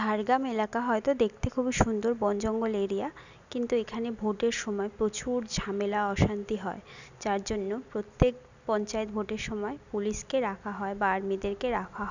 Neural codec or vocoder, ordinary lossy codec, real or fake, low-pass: none; none; real; 7.2 kHz